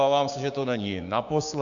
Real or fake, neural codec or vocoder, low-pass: fake; codec, 16 kHz, 6 kbps, DAC; 7.2 kHz